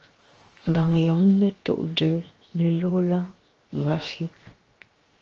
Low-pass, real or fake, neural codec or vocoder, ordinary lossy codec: 7.2 kHz; fake; codec, 16 kHz, 0.7 kbps, FocalCodec; Opus, 24 kbps